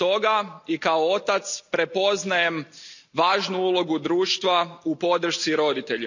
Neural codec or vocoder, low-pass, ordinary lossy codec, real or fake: none; 7.2 kHz; none; real